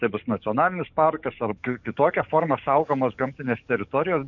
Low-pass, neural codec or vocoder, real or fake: 7.2 kHz; codec, 44.1 kHz, 7.8 kbps, DAC; fake